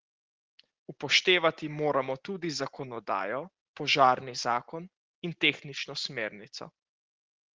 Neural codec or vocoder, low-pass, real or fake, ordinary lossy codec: none; 7.2 kHz; real; Opus, 16 kbps